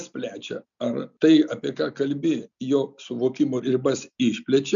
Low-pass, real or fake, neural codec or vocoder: 7.2 kHz; real; none